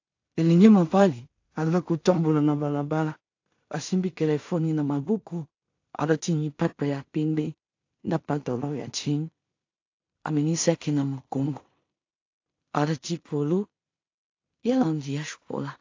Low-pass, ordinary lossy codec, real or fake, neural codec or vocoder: 7.2 kHz; AAC, 48 kbps; fake; codec, 16 kHz in and 24 kHz out, 0.4 kbps, LongCat-Audio-Codec, two codebook decoder